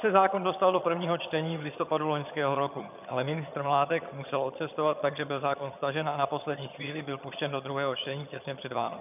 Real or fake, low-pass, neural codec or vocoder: fake; 3.6 kHz; vocoder, 22.05 kHz, 80 mel bands, HiFi-GAN